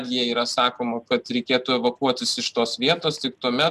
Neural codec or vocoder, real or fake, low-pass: none; real; 14.4 kHz